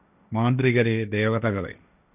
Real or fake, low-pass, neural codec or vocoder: fake; 3.6 kHz; codec, 16 kHz, 1.1 kbps, Voila-Tokenizer